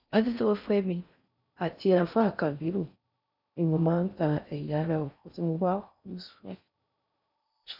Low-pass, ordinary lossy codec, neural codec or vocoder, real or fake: 5.4 kHz; none; codec, 16 kHz in and 24 kHz out, 0.6 kbps, FocalCodec, streaming, 4096 codes; fake